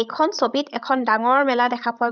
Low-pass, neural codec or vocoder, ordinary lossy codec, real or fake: 7.2 kHz; codec, 16 kHz, 16 kbps, FreqCodec, larger model; none; fake